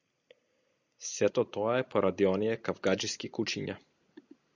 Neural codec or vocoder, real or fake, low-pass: none; real; 7.2 kHz